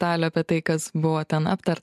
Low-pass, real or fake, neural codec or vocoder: 14.4 kHz; real; none